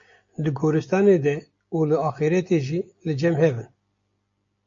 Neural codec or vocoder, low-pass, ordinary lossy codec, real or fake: none; 7.2 kHz; MP3, 48 kbps; real